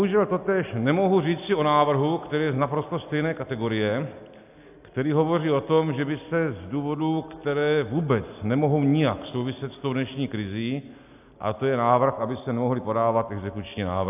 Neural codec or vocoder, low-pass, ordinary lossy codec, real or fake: none; 3.6 kHz; AAC, 32 kbps; real